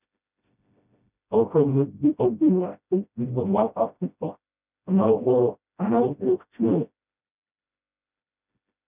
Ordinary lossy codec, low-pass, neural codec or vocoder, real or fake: none; 3.6 kHz; codec, 16 kHz, 0.5 kbps, FreqCodec, smaller model; fake